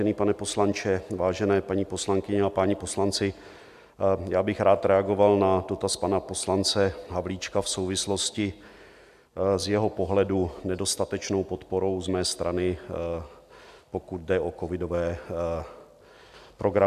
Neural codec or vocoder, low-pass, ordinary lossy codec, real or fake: none; 14.4 kHz; AAC, 96 kbps; real